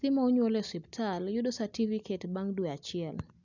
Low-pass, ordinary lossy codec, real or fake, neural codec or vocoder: 7.2 kHz; none; real; none